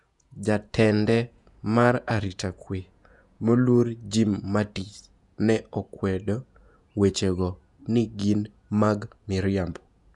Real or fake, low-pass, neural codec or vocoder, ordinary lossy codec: fake; 10.8 kHz; vocoder, 48 kHz, 128 mel bands, Vocos; none